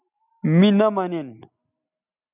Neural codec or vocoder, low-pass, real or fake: none; 3.6 kHz; real